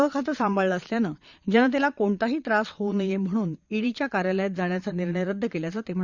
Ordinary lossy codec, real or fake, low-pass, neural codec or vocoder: Opus, 64 kbps; fake; 7.2 kHz; vocoder, 22.05 kHz, 80 mel bands, Vocos